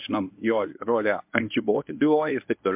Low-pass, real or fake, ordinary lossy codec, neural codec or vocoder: 3.6 kHz; fake; MP3, 32 kbps; codec, 24 kHz, 0.9 kbps, WavTokenizer, medium speech release version 1